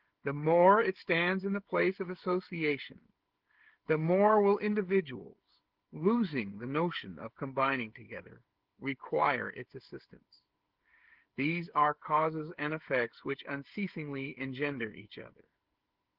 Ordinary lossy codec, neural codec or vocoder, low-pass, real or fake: Opus, 16 kbps; codec, 16 kHz, 16 kbps, FreqCodec, smaller model; 5.4 kHz; fake